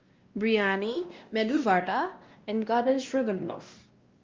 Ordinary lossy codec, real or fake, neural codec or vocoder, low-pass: Opus, 32 kbps; fake; codec, 16 kHz, 1 kbps, X-Codec, WavLM features, trained on Multilingual LibriSpeech; 7.2 kHz